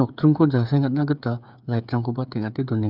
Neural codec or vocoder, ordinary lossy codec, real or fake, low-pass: codec, 16 kHz, 8 kbps, FreqCodec, smaller model; none; fake; 5.4 kHz